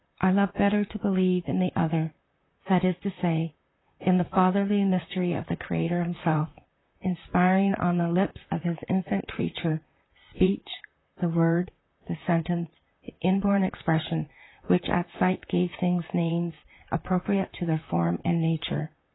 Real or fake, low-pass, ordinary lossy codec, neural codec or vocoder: fake; 7.2 kHz; AAC, 16 kbps; vocoder, 44.1 kHz, 128 mel bands, Pupu-Vocoder